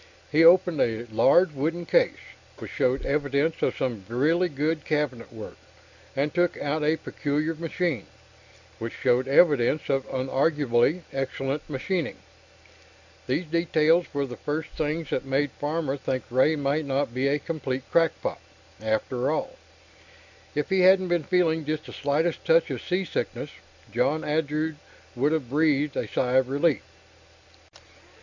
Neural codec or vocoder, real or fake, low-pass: none; real; 7.2 kHz